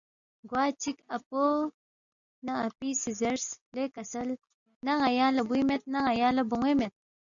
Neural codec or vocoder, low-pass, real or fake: none; 7.2 kHz; real